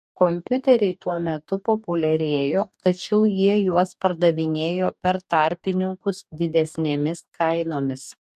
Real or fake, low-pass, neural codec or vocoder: fake; 14.4 kHz; codec, 44.1 kHz, 2.6 kbps, DAC